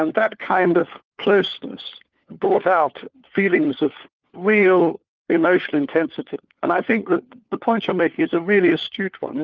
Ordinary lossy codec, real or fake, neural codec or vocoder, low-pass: Opus, 32 kbps; fake; codec, 16 kHz, 4 kbps, FunCodec, trained on LibriTTS, 50 frames a second; 7.2 kHz